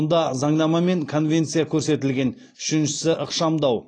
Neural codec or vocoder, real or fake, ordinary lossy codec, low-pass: none; real; AAC, 32 kbps; 9.9 kHz